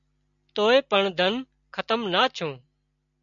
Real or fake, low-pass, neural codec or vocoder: real; 7.2 kHz; none